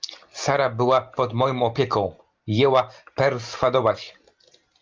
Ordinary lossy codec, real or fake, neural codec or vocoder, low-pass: Opus, 32 kbps; real; none; 7.2 kHz